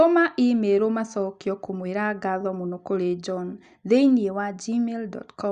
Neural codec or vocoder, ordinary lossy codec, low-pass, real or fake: none; Opus, 64 kbps; 9.9 kHz; real